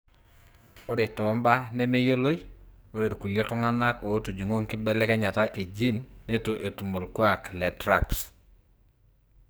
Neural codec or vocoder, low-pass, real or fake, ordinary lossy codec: codec, 44.1 kHz, 2.6 kbps, SNAC; none; fake; none